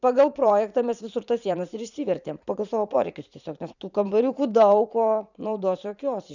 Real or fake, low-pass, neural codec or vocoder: fake; 7.2 kHz; vocoder, 44.1 kHz, 80 mel bands, Vocos